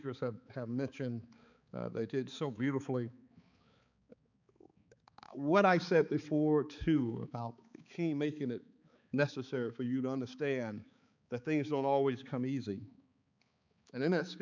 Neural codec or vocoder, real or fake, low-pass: codec, 16 kHz, 4 kbps, X-Codec, HuBERT features, trained on balanced general audio; fake; 7.2 kHz